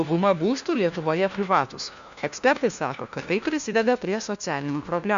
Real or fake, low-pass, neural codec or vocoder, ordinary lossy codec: fake; 7.2 kHz; codec, 16 kHz, 1 kbps, FunCodec, trained on LibriTTS, 50 frames a second; Opus, 64 kbps